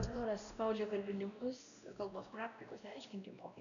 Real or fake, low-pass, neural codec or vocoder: fake; 7.2 kHz; codec, 16 kHz, 1 kbps, X-Codec, WavLM features, trained on Multilingual LibriSpeech